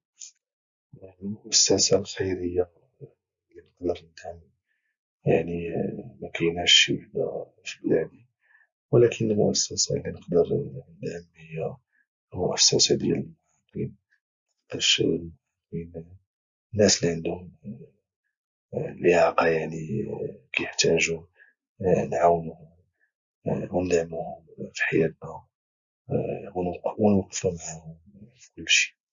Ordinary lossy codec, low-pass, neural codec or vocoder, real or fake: Opus, 64 kbps; 7.2 kHz; none; real